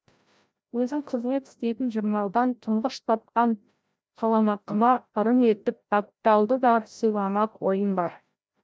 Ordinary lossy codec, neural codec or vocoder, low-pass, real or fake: none; codec, 16 kHz, 0.5 kbps, FreqCodec, larger model; none; fake